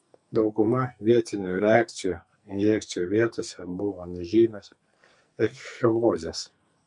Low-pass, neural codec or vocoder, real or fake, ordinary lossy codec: 10.8 kHz; codec, 44.1 kHz, 2.6 kbps, SNAC; fake; MP3, 64 kbps